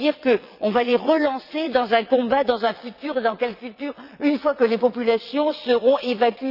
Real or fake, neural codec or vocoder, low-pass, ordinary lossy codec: fake; vocoder, 22.05 kHz, 80 mel bands, WaveNeXt; 5.4 kHz; none